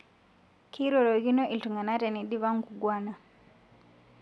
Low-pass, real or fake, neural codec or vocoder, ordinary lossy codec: none; real; none; none